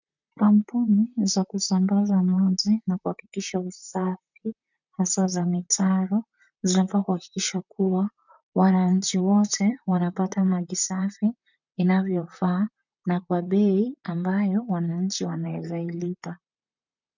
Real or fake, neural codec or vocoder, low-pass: fake; vocoder, 44.1 kHz, 128 mel bands, Pupu-Vocoder; 7.2 kHz